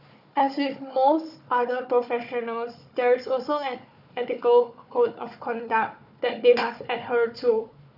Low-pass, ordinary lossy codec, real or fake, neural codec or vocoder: 5.4 kHz; none; fake; codec, 16 kHz, 4 kbps, FunCodec, trained on Chinese and English, 50 frames a second